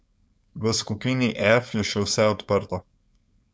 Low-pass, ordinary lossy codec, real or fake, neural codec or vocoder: none; none; fake; codec, 16 kHz, 4.8 kbps, FACodec